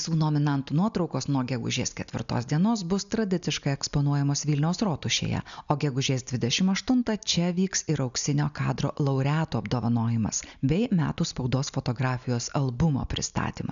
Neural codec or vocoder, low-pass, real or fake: none; 7.2 kHz; real